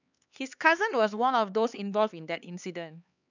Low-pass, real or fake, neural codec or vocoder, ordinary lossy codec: 7.2 kHz; fake; codec, 16 kHz, 4 kbps, X-Codec, HuBERT features, trained on LibriSpeech; none